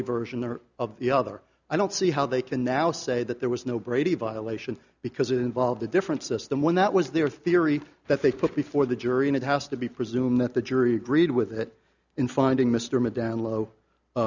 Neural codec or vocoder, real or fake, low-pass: none; real; 7.2 kHz